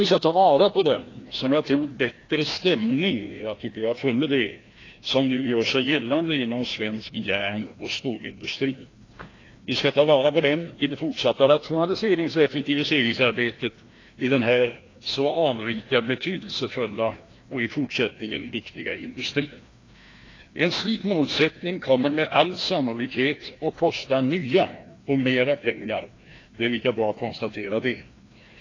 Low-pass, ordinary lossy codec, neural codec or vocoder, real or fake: 7.2 kHz; AAC, 32 kbps; codec, 16 kHz, 1 kbps, FreqCodec, larger model; fake